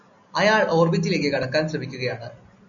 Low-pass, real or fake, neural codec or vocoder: 7.2 kHz; real; none